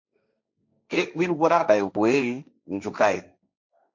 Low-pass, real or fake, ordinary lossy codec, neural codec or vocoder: 7.2 kHz; fake; MP3, 64 kbps; codec, 16 kHz, 1.1 kbps, Voila-Tokenizer